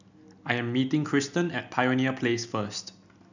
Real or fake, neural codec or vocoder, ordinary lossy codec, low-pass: real; none; none; 7.2 kHz